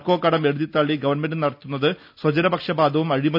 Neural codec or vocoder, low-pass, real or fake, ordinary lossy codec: none; 5.4 kHz; real; none